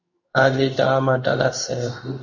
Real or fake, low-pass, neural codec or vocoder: fake; 7.2 kHz; codec, 16 kHz in and 24 kHz out, 1 kbps, XY-Tokenizer